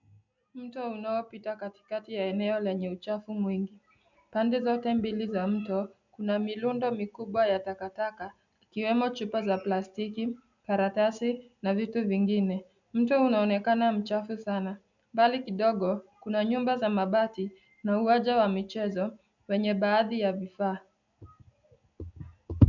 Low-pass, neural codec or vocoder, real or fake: 7.2 kHz; none; real